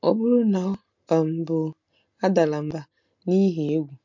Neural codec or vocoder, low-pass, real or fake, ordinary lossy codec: none; 7.2 kHz; real; MP3, 48 kbps